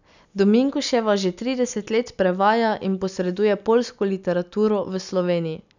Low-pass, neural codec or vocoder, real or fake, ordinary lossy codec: 7.2 kHz; none; real; none